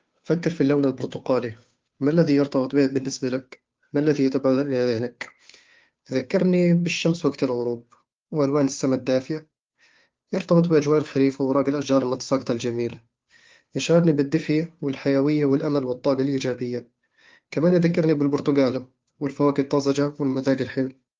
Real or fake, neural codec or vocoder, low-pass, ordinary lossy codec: fake; codec, 16 kHz, 2 kbps, FunCodec, trained on Chinese and English, 25 frames a second; 7.2 kHz; Opus, 32 kbps